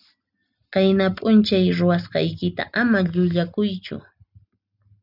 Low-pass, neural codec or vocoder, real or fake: 5.4 kHz; none; real